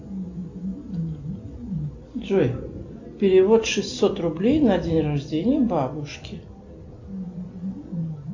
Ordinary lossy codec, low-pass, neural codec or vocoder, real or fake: AAC, 48 kbps; 7.2 kHz; none; real